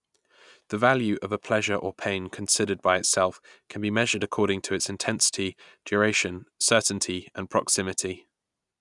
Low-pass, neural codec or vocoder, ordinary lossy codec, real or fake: 10.8 kHz; none; none; real